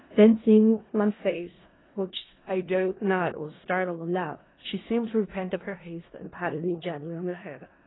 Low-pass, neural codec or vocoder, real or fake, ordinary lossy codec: 7.2 kHz; codec, 16 kHz in and 24 kHz out, 0.4 kbps, LongCat-Audio-Codec, four codebook decoder; fake; AAC, 16 kbps